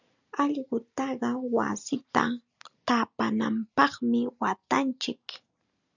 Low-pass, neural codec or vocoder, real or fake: 7.2 kHz; none; real